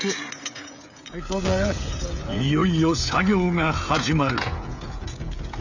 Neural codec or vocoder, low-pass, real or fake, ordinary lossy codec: codec, 16 kHz, 16 kbps, FreqCodec, smaller model; 7.2 kHz; fake; none